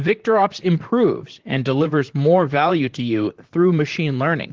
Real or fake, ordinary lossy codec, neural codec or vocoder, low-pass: fake; Opus, 16 kbps; vocoder, 44.1 kHz, 128 mel bands, Pupu-Vocoder; 7.2 kHz